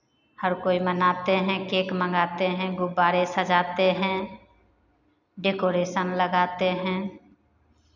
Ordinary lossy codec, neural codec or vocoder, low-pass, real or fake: none; none; 7.2 kHz; real